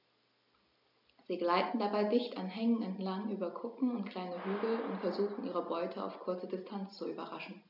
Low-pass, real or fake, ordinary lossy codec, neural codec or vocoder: 5.4 kHz; real; none; none